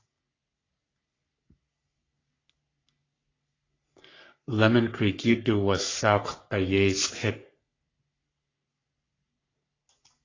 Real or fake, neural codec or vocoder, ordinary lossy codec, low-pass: fake; codec, 44.1 kHz, 3.4 kbps, Pupu-Codec; AAC, 32 kbps; 7.2 kHz